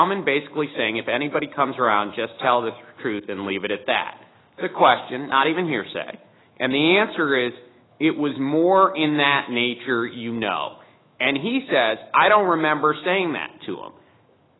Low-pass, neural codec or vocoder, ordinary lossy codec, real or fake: 7.2 kHz; autoencoder, 48 kHz, 128 numbers a frame, DAC-VAE, trained on Japanese speech; AAC, 16 kbps; fake